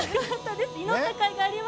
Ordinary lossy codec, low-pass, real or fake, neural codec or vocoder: none; none; real; none